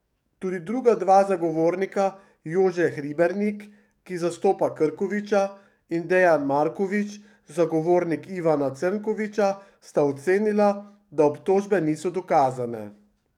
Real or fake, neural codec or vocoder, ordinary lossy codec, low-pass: fake; codec, 44.1 kHz, 7.8 kbps, DAC; none; 19.8 kHz